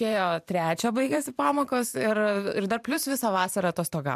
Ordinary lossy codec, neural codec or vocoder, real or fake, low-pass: MP3, 96 kbps; vocoder, 44.1 kHz, 128 mel bands every 512 samples, BigVGAN v2; fake; 14.4 kHz